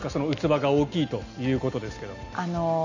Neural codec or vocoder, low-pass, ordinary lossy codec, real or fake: none; 7.2 kHz; none; real